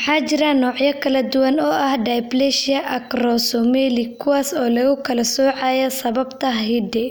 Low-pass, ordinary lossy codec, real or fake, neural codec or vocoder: none; none; real; none